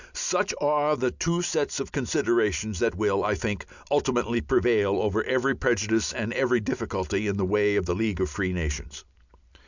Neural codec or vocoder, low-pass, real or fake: none; 7.2 kHz; real